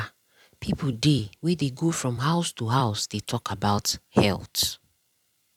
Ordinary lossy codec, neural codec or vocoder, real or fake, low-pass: none; vocoder, 44.1 kHz, 128 mel bands every 256 samples, BigVGAN v2; fake; 19.8 kHz